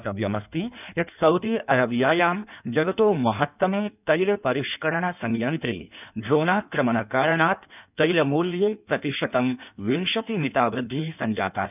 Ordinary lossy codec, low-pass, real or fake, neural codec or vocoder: none; 3.6 kHz; fake; codec, 16 kHz in and 24 kHz out, 1.1 kbps, FireRedTTS-2 codec